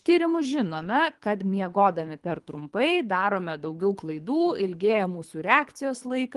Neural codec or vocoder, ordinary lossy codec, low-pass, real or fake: codec, 24 kHz, 3 kbps, HILCodec; Opus, 24 kbps; 10.8 kHz; fake